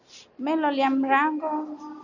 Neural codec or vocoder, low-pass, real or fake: none; 7.2 kHz; real